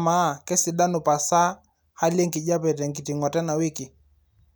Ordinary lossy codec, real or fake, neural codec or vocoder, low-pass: none; real; none; none